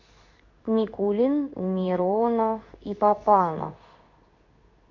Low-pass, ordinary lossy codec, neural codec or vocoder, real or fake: 7.2 kHz; MP3, 48 kbps; codec, 16 kHz in and 24 kHz out, 1 kbps, XY-Tokenizer; fake